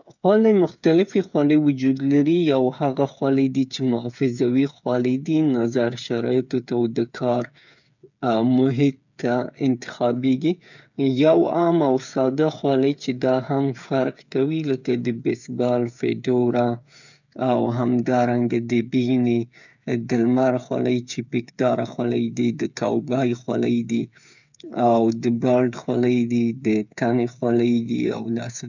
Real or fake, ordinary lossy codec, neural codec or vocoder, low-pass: fake; none; codec, 16 kHz, 8 kbps, FreqCodec, smaller model; 7.2 kHz